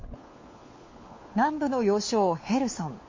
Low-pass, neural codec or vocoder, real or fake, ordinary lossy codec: 7.2 kHz; codec, 16 kHz, 4 kbps, FunCodec, trained on LibriTTS, 50 frames a second; fake; MP3, 32 kbps